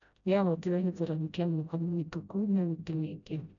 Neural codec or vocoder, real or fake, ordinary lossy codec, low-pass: codec, 16 kHz, 0.5 kbps, FreqCodec, smaller model; fake; MP3, 96 kbps; 7.2 kHz